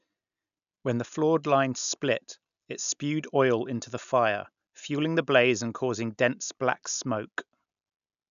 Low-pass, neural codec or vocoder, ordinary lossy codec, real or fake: 7.2 kHz; none; none; real